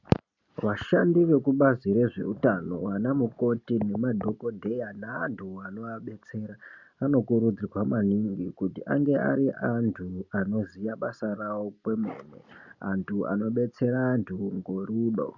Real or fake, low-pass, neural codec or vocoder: real; 7.2 kHz; none